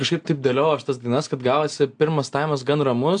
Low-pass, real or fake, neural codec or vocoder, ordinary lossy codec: 9.9 kHz; real; none; AAC, 64 kbps